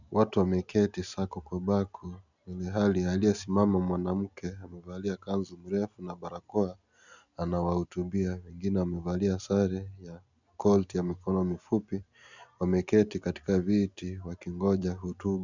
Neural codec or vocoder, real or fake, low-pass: none; real; 7.2 kHz